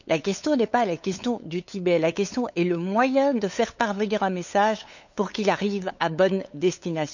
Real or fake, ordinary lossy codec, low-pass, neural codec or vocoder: fake; none; 7.2 kHz; codec, 16 kHz, 8 kbps, FunCodec, trained on LibriTTS, 25 frames a second